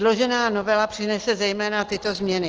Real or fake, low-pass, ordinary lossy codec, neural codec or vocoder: real; 7.2 kHz; Opus, 16 kbps; none